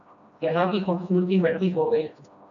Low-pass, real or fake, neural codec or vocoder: 7.2 kHz; fake; codec, 16 kHz, 1 kbps, FreqCodec, smaller model